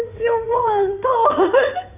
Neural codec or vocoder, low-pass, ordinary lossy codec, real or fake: codec, 16 kHz in and 24 kHz out, 2.2 kbps, FireRedTTS-2 codec; 3.6 kHz; none; fake